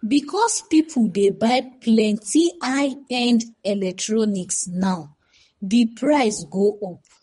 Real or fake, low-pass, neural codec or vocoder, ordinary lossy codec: fake; 10.8 kHz; codec, 24 kHz, 3 kbps, HILCodec; MP3, 48 kbps